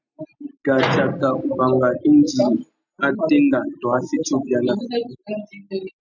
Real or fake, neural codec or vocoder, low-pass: real; none; 7.2 kHz